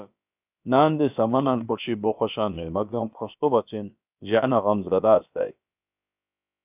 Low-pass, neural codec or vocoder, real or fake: 3.6 kHz; codec, 16 kHz, about 1 kbps, DyCAST, with the encoder's durations; fake